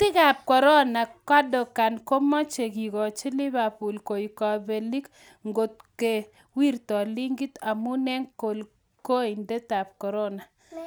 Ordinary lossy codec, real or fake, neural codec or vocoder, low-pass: none; real; none; none